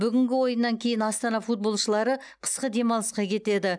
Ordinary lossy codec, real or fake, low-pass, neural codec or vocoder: none; real; 9.9 kHz; none